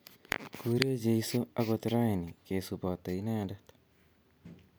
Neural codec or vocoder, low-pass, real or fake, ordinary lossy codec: none; none; real; none